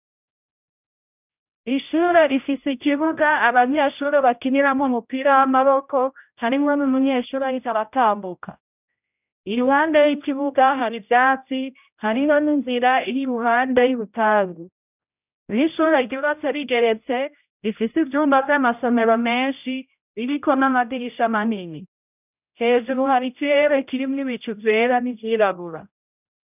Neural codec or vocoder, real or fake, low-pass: codec, 16 kHz, 0.5 kbps, X-Codec, HuBERT features, trained on general audio; fake; 3.6 kHz